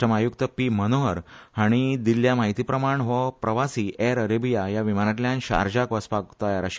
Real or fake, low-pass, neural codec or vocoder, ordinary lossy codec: real; none; none; none